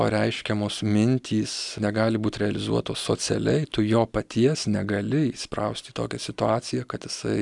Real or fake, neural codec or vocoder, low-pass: real; none; 10.8 kHz